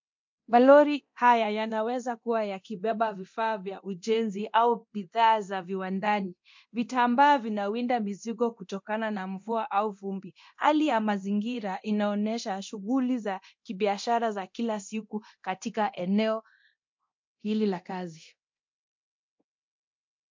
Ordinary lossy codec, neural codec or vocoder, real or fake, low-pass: MP3, 48 kbps; codec, 24 kHz, 0.9 kbps, DualCodec; fake; 7.2 kHz